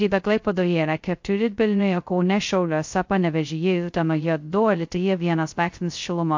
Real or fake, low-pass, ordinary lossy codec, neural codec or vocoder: fake; 7.2 kHz; MP3, 48 kbps; codec, 16 kHz, 0.2 kbps, FocalCodec